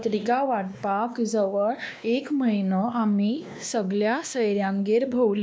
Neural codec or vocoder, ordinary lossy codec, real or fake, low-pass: codec, 16 kHz, 2 kbps, X-Codec, WavLM features, trained on Multilingual LibriSpeech; none; fake; none